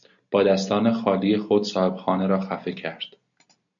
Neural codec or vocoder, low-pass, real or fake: none; 7.2 kHz; real